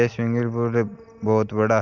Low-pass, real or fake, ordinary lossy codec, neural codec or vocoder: 7.2 kHz; real; Opus, 32 kbps; none